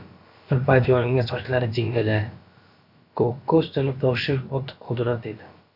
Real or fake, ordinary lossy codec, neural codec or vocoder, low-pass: fake; AAC, 48 kbps; codec, 16 kHz, about 1 kbps, DyCAST, with the encoder's durations; 5.4 kHz